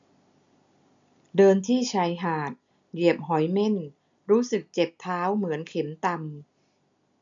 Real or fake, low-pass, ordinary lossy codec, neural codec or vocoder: real; 7.2 kHz; MP3, 64 kbps; none